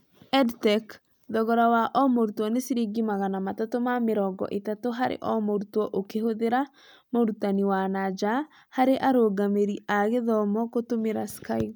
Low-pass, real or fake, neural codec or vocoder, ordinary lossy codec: none; real; none; none